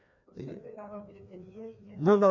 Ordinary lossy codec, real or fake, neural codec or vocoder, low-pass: none; fake; codec, 16 kHz, 2 kbps, FreqCodec, larger model; 7.2 kHz